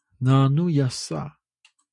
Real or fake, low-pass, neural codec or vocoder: real; 10.8 kHz; none